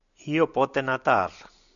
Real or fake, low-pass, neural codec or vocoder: real; 7.2 kHz; none